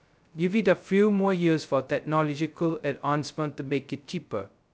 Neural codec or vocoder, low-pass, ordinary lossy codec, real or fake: codec, 16 kHz, 0.2 kbps, FocalCodec; none; none; fake